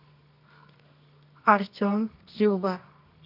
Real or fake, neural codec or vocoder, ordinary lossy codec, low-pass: fake; codec, 24 kHz, 0.9 kbps, WavTokenizer, medium music audio release; none; 5.4 kHz